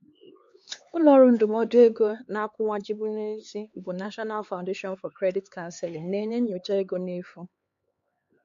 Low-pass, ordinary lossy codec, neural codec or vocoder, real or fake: 7.2 kHz; MP3, 48 kbps; codec, 16 kHz, 4 kbps, X-Codec, HuBERT features, trained on LibriSpeech; fake